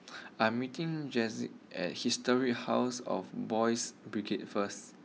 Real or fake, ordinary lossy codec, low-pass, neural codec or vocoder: real; none; none; none